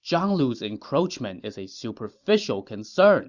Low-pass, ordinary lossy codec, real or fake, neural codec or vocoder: 7.2 kHz; Opus, 64 kbps; fake; vocoder, 44.1 kHz, 128 mel bands every 512 samples, BigVGAN v2